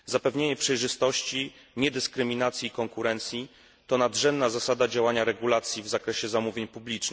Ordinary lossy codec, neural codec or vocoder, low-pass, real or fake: none; none; none; real